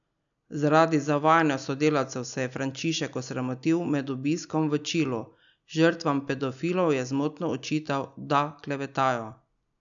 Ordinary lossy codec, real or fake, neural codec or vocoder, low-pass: none; real; none; 7.2 kHz